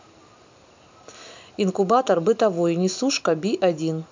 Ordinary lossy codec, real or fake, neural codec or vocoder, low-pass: MP3, 64 kbps; real; none; 7.2 kHz